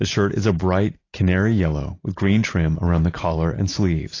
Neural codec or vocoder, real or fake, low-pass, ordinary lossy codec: none; real; 7.2 kHz; AAC, 32 kbps